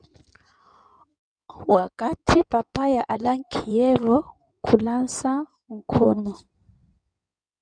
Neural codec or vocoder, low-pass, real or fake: codec, 16 kHz in and 24 kHz out, 2.2 kbps, FireRedTTS-2 codec; 9.9 kHz; fake